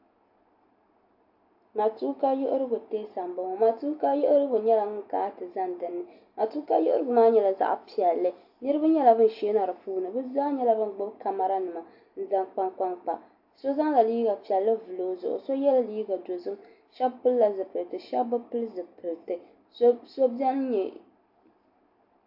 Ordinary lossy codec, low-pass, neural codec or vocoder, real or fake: AAC, 48 kbps; 5.4 kHz; none; real